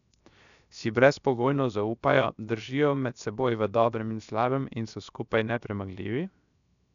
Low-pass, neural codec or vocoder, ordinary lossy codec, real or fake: 7.2 kHz; codec, 16 kHz, 0.7 kbps, FocalCodec; none; fake